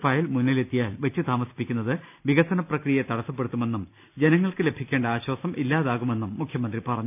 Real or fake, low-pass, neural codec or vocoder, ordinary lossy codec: real; 3.6 kHz; none; none